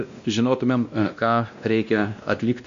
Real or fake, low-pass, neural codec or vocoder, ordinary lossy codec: fake; 7.2 kHz; codec, 16 kHz, 1 kbps, X-Codec, WavLM features, trained on Multilingual LibriSpeech; MP3, 64 kbps